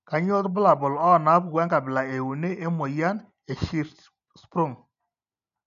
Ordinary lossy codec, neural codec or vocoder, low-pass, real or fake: none; none; 7.2 kHz; real